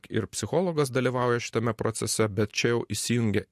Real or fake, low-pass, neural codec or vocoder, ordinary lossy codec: real; 14.4 kHz; none; MP3, 64 kbps